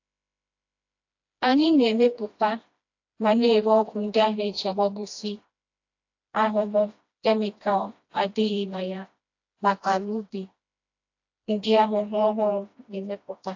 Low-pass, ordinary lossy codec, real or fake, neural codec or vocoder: 7.2 kHz; AAC, 48 kbps; fake; codec, 16 kHz, 1 kbps, FreqCodec, smaller model